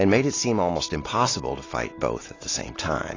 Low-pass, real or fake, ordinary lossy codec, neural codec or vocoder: 7.2 kHz; real; AAC, 32 kbps; none